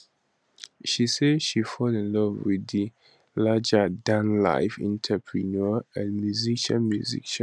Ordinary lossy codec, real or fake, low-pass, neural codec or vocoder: none; real; none; none